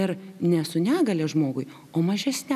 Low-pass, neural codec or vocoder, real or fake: 14.4 kHz; none; real